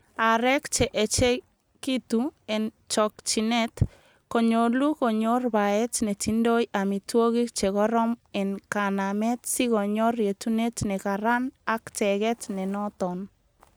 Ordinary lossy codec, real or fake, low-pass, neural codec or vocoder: none; real; none; none